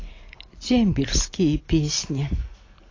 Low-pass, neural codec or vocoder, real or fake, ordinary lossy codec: 7.2 kHz; none; real; AAC, 32 kbps